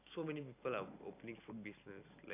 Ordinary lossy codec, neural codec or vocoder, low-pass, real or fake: none; none; 3.6 kHz; real